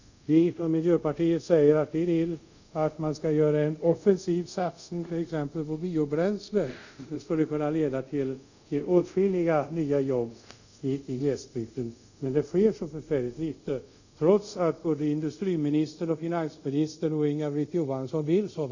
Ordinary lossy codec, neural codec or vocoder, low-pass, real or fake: none; codec, 24 kHz, 0.5 kbps, DualCodec; 7.2 kHz; fake